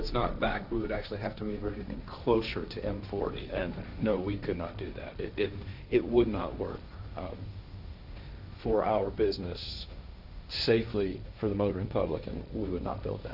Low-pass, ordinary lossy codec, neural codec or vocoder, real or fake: 5.4 kHz; Opus, 64 kbps; codec, 16 kHz, 1.1 kbps, Voila-Tokenizer; fake